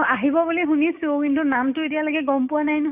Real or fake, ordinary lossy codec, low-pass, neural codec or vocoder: fake; none; 3.6 kHz; codec, 16 kHz, 6 kbps, DAC